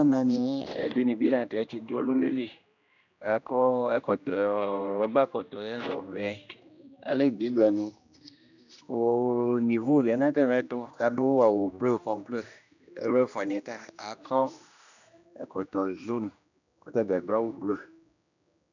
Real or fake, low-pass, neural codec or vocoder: fake; 7.2 kHz; codec, 16 kHz, 1 kbps, X-Codec, HuBERT features, trained on general audio